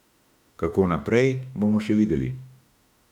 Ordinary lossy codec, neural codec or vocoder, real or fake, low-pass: none; autoencoder, 48 kHz, 32 numbers a frame, DAC-VAE, trained on Japanese speech; fake; 19.8 kHz